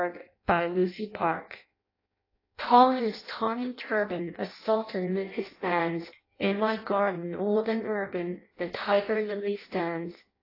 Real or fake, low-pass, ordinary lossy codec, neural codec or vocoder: fake; 5.4 kHz; AAC, 32 kbps; codec, 16 kHz in and 24 kHz out, 0.6 kbps, FireRedTTS-2 codec